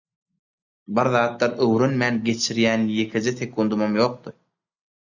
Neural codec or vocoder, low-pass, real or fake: none; 7.2 kHz; real